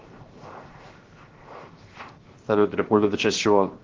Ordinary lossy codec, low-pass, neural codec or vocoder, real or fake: Opus, 16 kbps; 7.2 kHz; codec, 16 kHz, 0.7 kbps, FocalCodec; fake